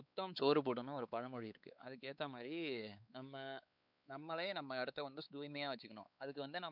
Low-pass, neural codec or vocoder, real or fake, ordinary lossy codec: 5.4 kHz; codec, 16 kHz, 4 kbps, X-Codec, WavLM features, trained on Multilingual LibriSpeech; fake; none